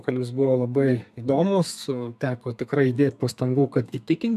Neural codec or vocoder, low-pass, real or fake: codec, 32 kHz, 1.9 kbps, SNAC; 14.4 kHz; fake